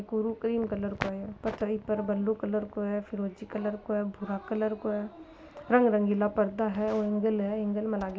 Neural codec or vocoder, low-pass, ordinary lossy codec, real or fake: none; none; none; real